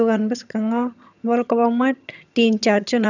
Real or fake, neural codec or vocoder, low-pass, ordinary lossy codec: fake; vocoder, 22.05 kHz, 80 mel bands, HiFi-GAN; 7.2 kHz; none